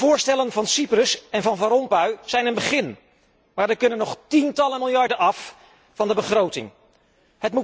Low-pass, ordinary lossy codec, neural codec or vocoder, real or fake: none; none; none; real